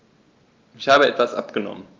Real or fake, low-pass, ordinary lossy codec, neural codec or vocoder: real; 7.2 kHz; Opus, 32 kbps; none